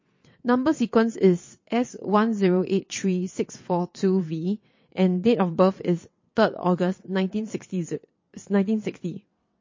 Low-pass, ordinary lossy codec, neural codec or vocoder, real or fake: 7.2 kHz; MP3, 32 kbps; vocoder, 22.05 kHz, 80 mel bands, WaveNeXt; fake